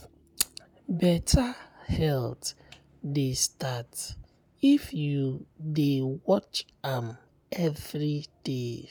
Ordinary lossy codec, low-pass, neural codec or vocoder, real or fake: none; none; none; real